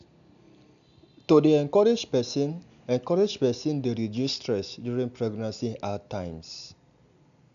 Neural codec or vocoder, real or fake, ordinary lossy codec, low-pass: none; real; none; 7.2 kHz